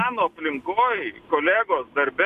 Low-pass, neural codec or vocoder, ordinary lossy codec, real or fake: 14.4 kHz; none; MP3, 96 kbps; real